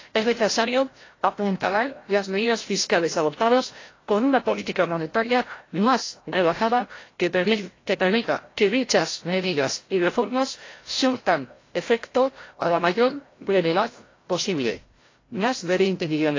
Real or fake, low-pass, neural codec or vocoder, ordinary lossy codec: fake; 7.2 kHz; codec, 16 kHz, 0.5 kbps, FreqCodec, larger model; AAC, 32 kbps